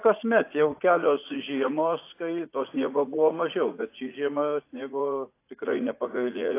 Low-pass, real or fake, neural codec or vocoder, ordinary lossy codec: 3.6 kHz; fake; vocoder, 44.1 kHz, 80 mel bands, Vocos; AAC, 24 kbps